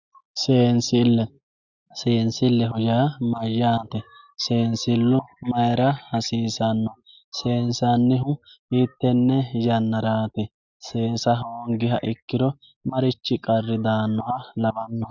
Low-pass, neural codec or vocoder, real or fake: 7.2 kHz; none; real